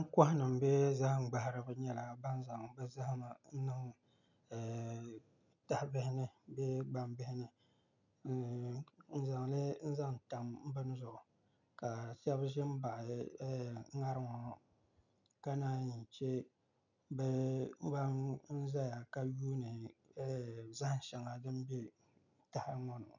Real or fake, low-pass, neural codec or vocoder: real; 7.2 kHz; none